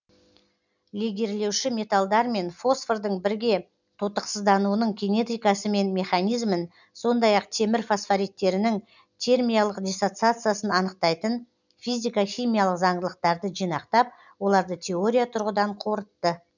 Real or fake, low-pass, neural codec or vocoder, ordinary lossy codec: real; 7.2 kHz; none; none